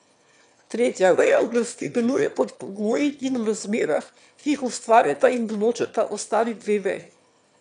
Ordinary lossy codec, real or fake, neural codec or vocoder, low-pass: none; fake; autoencoder, 22.05 kHz, a latent of 192 numbers a frame, VITS, trained on one speaker; 9.9 kHz